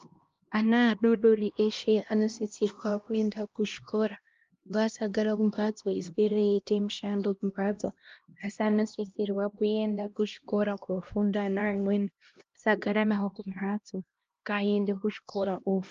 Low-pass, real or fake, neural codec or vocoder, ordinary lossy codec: 7.2 kHz; fake; codec, 16 kHz, 1 kbps, X-Codec, HuBERT features, trained on LibriSpeech; Opus, 32 kbps